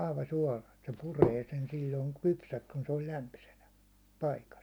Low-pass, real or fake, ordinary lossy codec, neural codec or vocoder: none; real; none; none